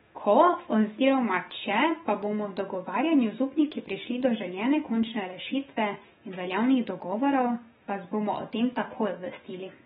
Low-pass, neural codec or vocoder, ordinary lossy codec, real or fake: 19.8 kHz; autoencoder, 48 kHz, 128 numbers a frame, DAC-VAE, trained on Japanese speech; AAC, 16 kbps; fake